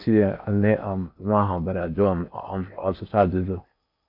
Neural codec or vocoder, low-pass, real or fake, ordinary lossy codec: codec, 16 kHz in and 24 kHz out, 0.8 kbps, FocalCodec, streaming, 65536 codes; 5.4 kHz; fake; none